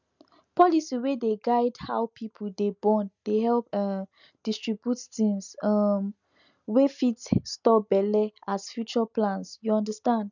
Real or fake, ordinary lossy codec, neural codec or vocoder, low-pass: real; none; none; 7.2 kHz